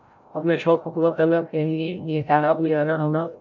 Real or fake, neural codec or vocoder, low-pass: fake; codec, 16 kHz, 0.5 kbps, FreqCodec, larger model; 7.2 kHz